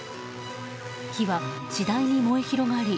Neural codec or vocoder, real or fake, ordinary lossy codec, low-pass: none; real; none; none